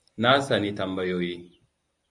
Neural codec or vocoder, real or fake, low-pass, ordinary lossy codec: none; real; 10.8 kHz; AAC, 64 kbps